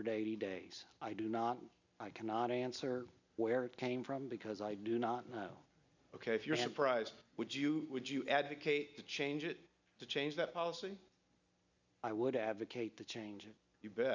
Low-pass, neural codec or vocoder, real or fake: 7.2 kHz; none; real